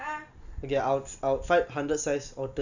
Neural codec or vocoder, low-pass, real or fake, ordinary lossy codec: none; 7.2 kHz; real; none